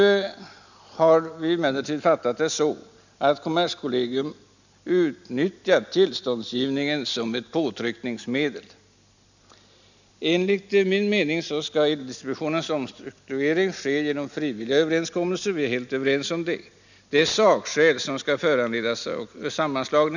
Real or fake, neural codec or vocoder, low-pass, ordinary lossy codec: real; none; 7.2 kHz; none